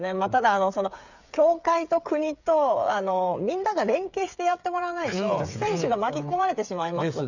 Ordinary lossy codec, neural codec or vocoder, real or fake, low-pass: none; codec, 16 kHz, 8 kbps, FreqCodec, smaller model; fake; 7.2 kHz